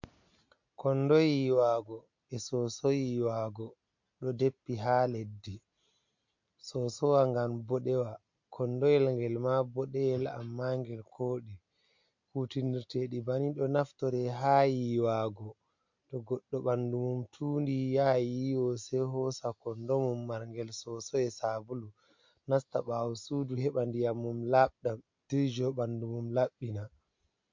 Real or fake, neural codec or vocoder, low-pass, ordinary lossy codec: real; none; 7.2 kHz; MP3, 48 kbps